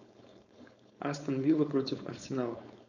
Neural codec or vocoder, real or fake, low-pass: codec, 16 kHz, 4.8 kbps, FACodec; fake; 7.2 kHz